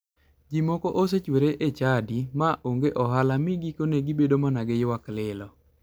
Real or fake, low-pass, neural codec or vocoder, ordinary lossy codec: real; none; none; none